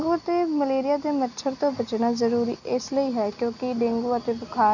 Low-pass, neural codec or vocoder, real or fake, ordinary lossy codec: 7.2 kHz; none; real; none